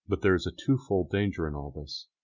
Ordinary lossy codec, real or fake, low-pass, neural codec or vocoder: Opus, 64 kbps; real; 7.2 kHz; none